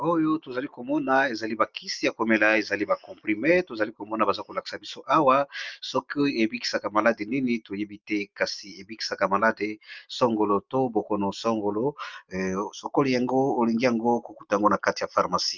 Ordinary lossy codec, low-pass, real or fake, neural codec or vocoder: Opus, 24 kbps; 7.2 kHz; real; none